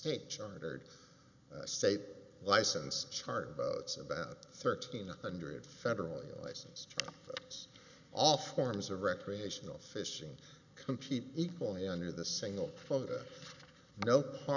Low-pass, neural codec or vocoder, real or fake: 7.2 kHz; none; real